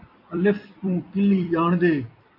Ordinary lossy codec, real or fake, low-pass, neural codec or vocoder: MP3, 32 kbps; fake; 5.4 kHz; vocoder, 44.1 kHz, 128 mel bands every 256 samples, BigVGAN v2